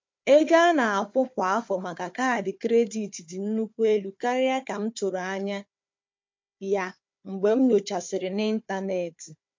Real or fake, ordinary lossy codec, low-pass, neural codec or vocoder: fake; MP3, 48 kbps; 7.2 kHz; codec, 16 kHz, 4 kbps, FunCodec, trained on Chinese and English, 50 frames a second